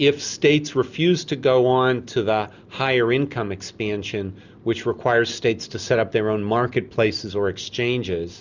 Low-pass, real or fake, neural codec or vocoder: 7.2 kHz; real; none